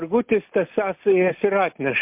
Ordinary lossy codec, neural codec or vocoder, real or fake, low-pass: MP3, 32 kbps; none; real; 3.6 kHz